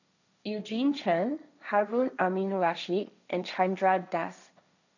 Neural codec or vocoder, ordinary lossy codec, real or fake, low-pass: codec, 16 kHz, 1.1 kbps, Voila-Tokenizer; none; fake; 7.2 kHz